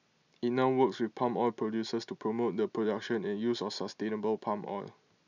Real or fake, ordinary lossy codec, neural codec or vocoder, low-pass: real; none; none; 7.2 kHz